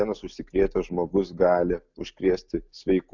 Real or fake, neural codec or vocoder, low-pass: real; none; 7.2 kHz